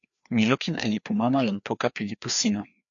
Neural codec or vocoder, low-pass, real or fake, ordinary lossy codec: codec, 16 kHz, 2 kbps, FreqCodec, larger model; 7.2 kHz; fake; AAC, 64 kbps